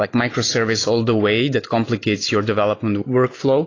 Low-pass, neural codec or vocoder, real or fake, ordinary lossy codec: 7.2 kHz; none; real; AAC, 32 kbps